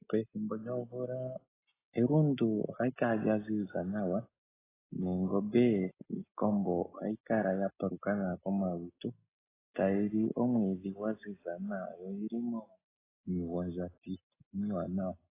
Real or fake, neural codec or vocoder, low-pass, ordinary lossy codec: real; none; 3.6 kHz; AAC, 16 kbps